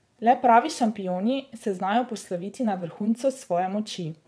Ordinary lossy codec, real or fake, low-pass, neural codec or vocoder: none; fake; none; vocoder, 22.05 kHz, 80 mel bands, WaveNeXt